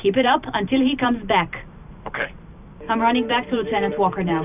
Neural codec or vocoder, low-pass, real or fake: vocoder, 44.1 kHz, 128 mel bands every 512 samples, BigVGAN v2; 3.6 kHz; fake